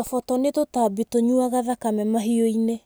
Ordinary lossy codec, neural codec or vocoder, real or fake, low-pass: none; none; real; none